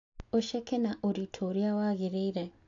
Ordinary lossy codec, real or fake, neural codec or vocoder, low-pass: AAC, 48 kbps; real; none; 7.2 kHz